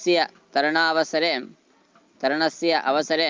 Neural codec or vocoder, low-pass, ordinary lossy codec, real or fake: none; 7.2 kHz; Opus, 24 kbps; real